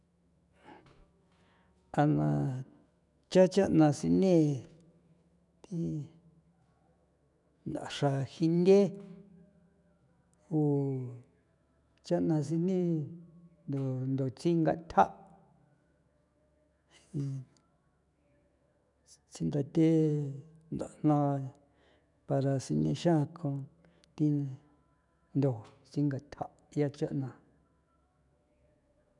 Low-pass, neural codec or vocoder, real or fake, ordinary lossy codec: 10.8 kHz; autoencoder, 48 kHz, 128 numbers a frame, DAC-VAE, trained on Japanese speech; fake; none